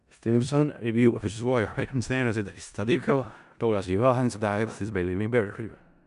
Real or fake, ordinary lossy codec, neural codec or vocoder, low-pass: fake; none; codec, 16 kHz in and 24 kHz out, 0.4 kbps, LongCat-Audio-Codec, four codebook decoder; 10.8 kHz